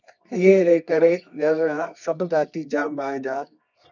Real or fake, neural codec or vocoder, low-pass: fake; codec, 24 kHz, 0.9 kbps, WavTokenizer, medium music audio release; 7.2 kHz